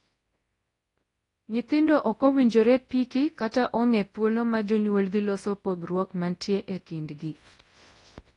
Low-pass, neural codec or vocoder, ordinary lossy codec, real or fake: 10.8 kHz; codec, 24 kHz, 0.9 kbps, WavTokenizer, large speech release; AAC, 32 kbps; fake